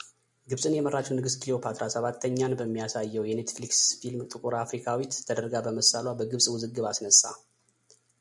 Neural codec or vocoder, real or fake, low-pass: none; real; 10.8 kHz